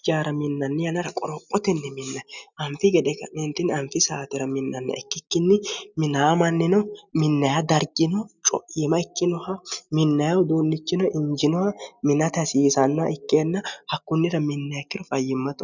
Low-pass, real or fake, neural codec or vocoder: 7.2 kHz; real; none